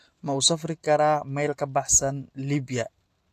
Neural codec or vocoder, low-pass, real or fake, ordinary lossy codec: none; 14.4 kHz; real; AAC, 64 kbps